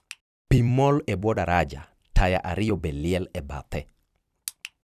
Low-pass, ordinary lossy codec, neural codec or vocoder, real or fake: 14.4 kHz; none; vocoder, 44.1 kHz, 128 mel bands every 256 samples, BigVGAN v2; fake